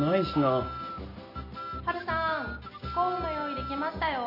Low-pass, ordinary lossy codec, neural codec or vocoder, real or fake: 5.4 kHz; none; none; real